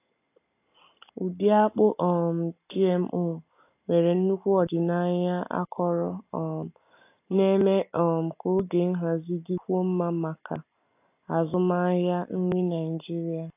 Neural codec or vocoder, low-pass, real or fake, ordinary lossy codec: none; 3.6 kHz; real; AAC, 24 kbps